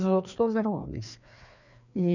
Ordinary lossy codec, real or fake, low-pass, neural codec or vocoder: none; fake; 7.2 kHz; codec, 16 kHz, 2 kbps, FreqCodec, larger model